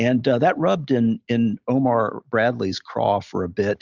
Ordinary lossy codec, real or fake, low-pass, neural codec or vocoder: Opus, 64 kbps; real; 7.2 kHz; none